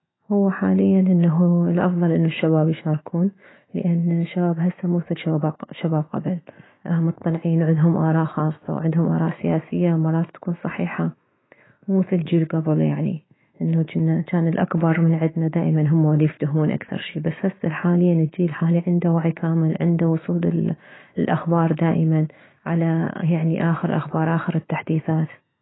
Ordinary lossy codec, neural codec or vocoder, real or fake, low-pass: AAC, 16 kbps; autoencoder, 48 kHz, 128 numbers a frame, DAC-VAE, trained on Japanese speech; fake; 7.2 kHz